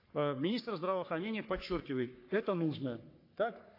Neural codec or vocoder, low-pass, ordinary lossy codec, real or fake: codec, 44.1 kHz, 3.4 kbps, Pupu-Codec; 5.4 kHz; AAC, 32 kbps; fake